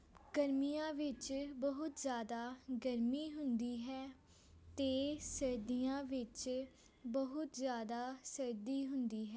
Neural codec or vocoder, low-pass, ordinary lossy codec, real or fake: none; none; none; real